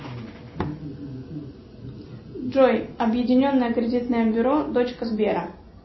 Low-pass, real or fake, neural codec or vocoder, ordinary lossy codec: 7.2 kHz; real; none; MP3, 24 kbps